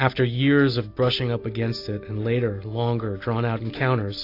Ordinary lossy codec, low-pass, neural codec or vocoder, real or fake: AAC, 32 kbps; 5.4 kHz; none; real